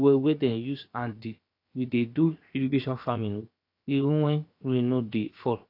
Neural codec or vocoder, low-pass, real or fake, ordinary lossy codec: codec, 16 kHz, 0.7 kbps, FocalCodec; 5.4 kHz; fake; AAC, 32 kbps